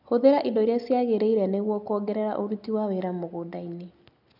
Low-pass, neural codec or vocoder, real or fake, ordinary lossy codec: 5.4 kHz; none; real; none